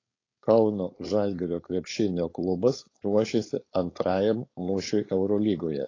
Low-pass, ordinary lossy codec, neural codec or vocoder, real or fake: 7.2 kHz; AAC, 32 kbps; codec, 16 kHz, 4.8 kbps, FACodec; fake